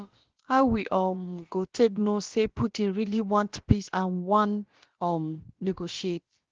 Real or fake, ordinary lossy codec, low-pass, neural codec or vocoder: fake; Opus, 16 kbps; 7.2 kHz; codec, 16 kHz, about 1 kbps, DyCAST, with the encoder's durations